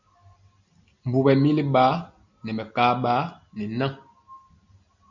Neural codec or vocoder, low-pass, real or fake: none; 7.2 kHz; real